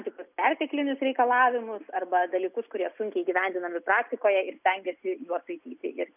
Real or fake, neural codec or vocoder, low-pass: real; none; 3.6 kHz